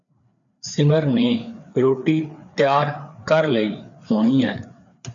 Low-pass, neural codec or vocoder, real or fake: 7.2 kHz; codec, 16 kHz, 4 kbps, FreqCodec, larger model; fake